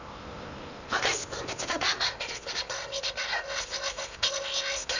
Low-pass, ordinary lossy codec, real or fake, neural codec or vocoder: 7.2 kHz; none; fake; codec, 16 kHz in and 24 kHz out, 0.8 kbps, FocalCodec, streaming, 65536 codes